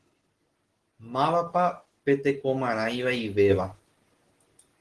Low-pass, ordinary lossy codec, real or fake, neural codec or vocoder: 10.8 kHz; Opus, 16 kbps; real; none